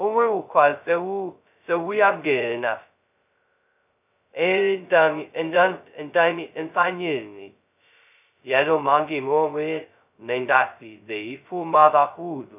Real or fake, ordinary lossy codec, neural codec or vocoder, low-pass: fake; none; codec, 16 kHz, 0.2 kbps, FocalCodec; 3.6 kHz